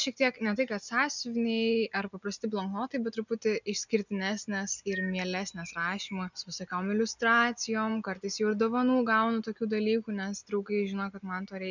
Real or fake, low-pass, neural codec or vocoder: real; 7.2 kHz; none